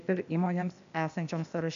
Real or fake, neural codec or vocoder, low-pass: fake; codec, 16 kHz, 0.8 kbps, ZipCodec; 7.2 kHz